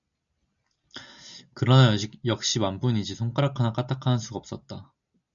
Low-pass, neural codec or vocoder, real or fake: 7.2 kHz; none; real